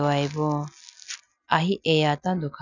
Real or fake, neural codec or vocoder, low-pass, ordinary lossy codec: real; none; 7.2 kHz; none